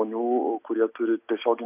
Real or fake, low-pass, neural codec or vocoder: real; 3.6 kHz; none